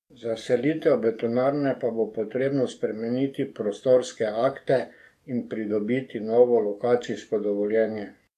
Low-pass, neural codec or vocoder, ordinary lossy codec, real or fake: 14.4 kHz; codec, 44.1 kHz, 7.8 kbps, DAC; AAC, 96 kbps; fake